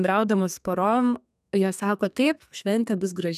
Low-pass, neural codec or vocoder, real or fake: 14.4 kHz; codec, 32 kHz, 1.9 kbps, SNAC; fake